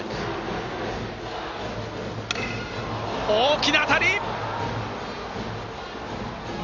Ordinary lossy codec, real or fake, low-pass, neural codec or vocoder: Opus, 64 kbps; real; 7.2 kHz; none